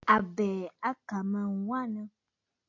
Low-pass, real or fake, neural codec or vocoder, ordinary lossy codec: 7.2 kHz; real; none; AAC, 48 kbps